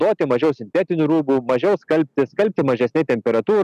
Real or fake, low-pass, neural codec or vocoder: real; 14.4 kHz; none